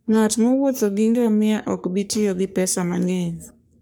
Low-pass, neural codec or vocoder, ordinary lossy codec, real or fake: none; codec, 44.1 kHz, 3.4 kbps, Pupu-Codec; none; fake